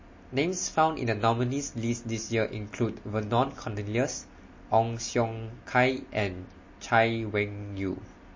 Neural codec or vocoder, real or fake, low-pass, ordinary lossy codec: none; real; 7.2 kHz; MP3, 32 kbps